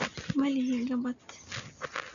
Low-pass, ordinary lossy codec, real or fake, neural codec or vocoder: 7.2 kHz; none; real; none